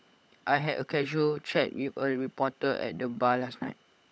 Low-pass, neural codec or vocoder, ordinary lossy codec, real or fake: none; codec, 16 kHz, 16 kbps, FunCodec, trained on LibriTTS, 50 frames a second; none; fake